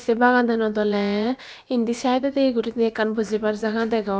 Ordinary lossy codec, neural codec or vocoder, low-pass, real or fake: none; codec, 16 kHz, about 1 kbps, DyCAST, with the encoder's durations; none; fake